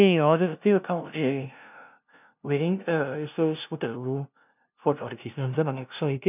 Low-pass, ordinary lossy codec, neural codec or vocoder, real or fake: 3.6 kHz; none; codec, 16 kHz, 0.5 kbps, FunCodec, trained on LibriTTS, 25 frames a second; fake